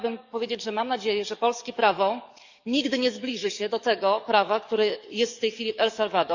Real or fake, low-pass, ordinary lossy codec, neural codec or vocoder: fake; 7.2 kHz; none; codec, 44.1 kHz, 7.8 kbps, DAC